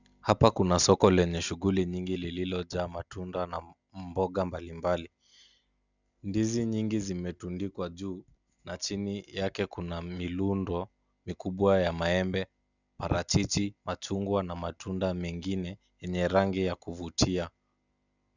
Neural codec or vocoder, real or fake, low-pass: none; real; 7.2 kHz